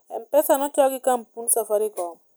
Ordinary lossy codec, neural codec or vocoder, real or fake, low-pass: none; none; real; none